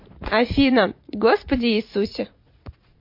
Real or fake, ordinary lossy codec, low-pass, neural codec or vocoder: fake; MP3, 32 kbps; 5.4 kHz; vocoder, 44.1 kHz, 80 mel bands, Vocos